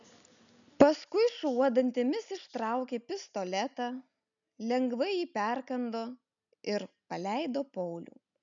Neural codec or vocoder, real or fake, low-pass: none; real; 7.2 kHz